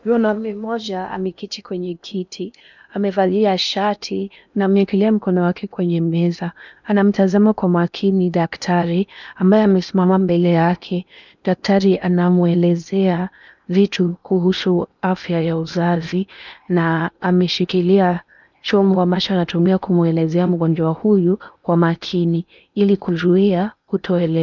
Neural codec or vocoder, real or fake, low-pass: codec, 16 kHz in and 24 kHz out, 0.8 kbps, FocalCodec, streaming, 65536 codes; fake; 7.2 kHz